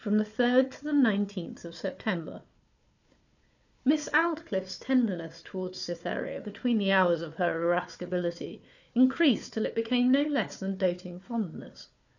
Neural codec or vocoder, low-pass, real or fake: codec, 16 kHz, 4 kbps, FunCodec, trained on Chinese and English, 50 frames a second; 7.2 kHz; fake